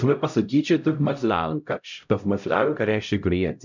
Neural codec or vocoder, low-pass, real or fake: codec, 16 kHz, 0.5 kbps, X-Codec, HuBERT features, trained on LibriSpeech; 7.2 kHz; fake